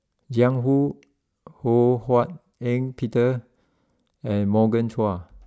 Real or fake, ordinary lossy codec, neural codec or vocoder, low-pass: real; none; none; none